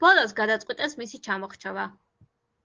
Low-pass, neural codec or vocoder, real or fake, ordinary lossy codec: 7.2 kHz; none; real; Opus, 16 kbps